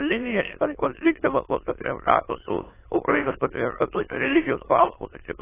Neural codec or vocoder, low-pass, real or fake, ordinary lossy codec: autoencoder, 22.05 kHz, a latent of 192 numbers a frame, VITS, trained on many speakers; 3.6 kHz; fake; AAC, 16 kbps